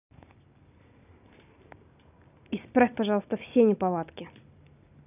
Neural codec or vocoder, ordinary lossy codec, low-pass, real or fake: none; none; 3.6 kHz; real